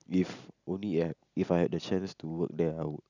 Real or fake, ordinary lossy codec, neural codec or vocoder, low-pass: real; none; none; 7.2 kHz